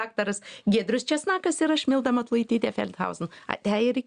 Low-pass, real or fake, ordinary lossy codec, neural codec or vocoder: 9.9 kHz; real; Opus, 64 kbps; none